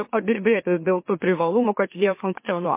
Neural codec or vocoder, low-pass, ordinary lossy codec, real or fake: autoencoder, 44.1 kHz, a latent of 192 numbers a frame, MeloTTS; 3.6 kHz; MP3, 24 kbps; fake